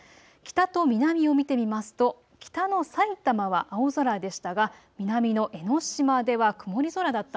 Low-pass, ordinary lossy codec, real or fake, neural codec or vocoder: none; none; real; none